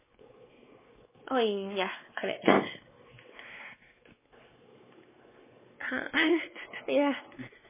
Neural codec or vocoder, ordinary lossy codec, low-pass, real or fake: codec, 16 kHz, 4 kbps, X-Codec, WavLM features, trained on Multilingual LibriSpeech; MP3, 16 kbps; 3.6 kHz; fake